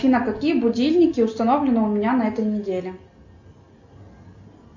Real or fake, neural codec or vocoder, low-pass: real; none; 7.2 kHz